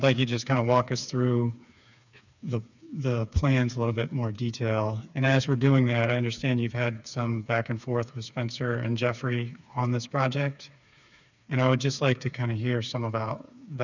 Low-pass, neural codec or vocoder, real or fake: 7.2 kHz; codec, 16 kHz, 4 kbps, FreqCodec, smaller model; fake